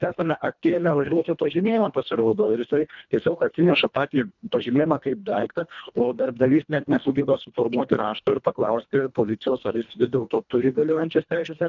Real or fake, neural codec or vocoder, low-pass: fake; codec, 24 kHz, 1.5 kbps, HILCodec; 7.2 kHz